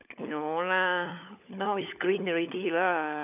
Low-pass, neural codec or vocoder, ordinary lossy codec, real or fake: 3.6 kHz; codec, 16 kHz, 16 kbps, FunCodec, trained on LibriTTS, 50 frames a second; none; fake